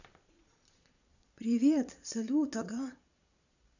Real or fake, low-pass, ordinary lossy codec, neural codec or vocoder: fake; 7.2 kHz; none; vocoder, 22.05 kHz, 80 mel bands, Vocos